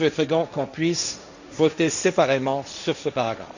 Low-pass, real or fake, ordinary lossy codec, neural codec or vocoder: 7.2 kHz; fake; none; codec, 16 kHz, 1.1 kbps, Voila-Tokenizer